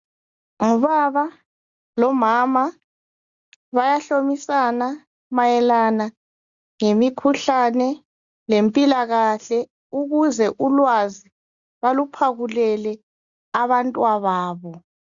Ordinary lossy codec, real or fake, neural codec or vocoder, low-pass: Opus, 64 kbps; real; none; 7.2 kHz